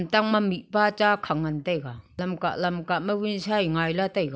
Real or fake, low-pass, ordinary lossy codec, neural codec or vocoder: real; none; none; none